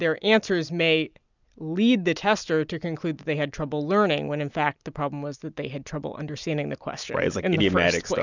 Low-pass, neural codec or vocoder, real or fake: 7.2 kHz; none; real